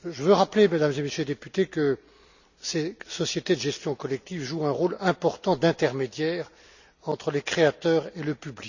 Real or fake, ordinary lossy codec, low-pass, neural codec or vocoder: real; none; 7.2 kHz; none